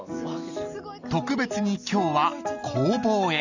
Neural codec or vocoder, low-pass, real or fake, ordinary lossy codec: none; 7.2 kHz; real; none